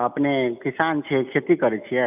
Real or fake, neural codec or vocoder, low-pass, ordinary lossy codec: real; none; 3.6 kHz; none